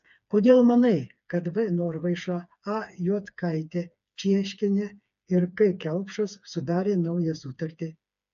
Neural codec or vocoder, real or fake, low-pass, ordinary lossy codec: codec, 16 kHz, 4 kbps, FreqCodec, smaller model; fake; 7.2 kHz; AAC, 96 kbps